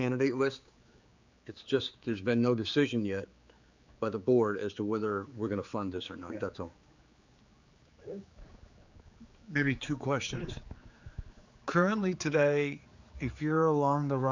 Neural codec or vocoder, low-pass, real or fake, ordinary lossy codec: codec, 16 kHz, 4 kbps, X-Codec, HuBERT features, trained on general audio; 7.2 kHz; fake; Opus, 64 kbps